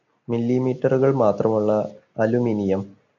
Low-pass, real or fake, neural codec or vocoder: 7.2 kHz; real; none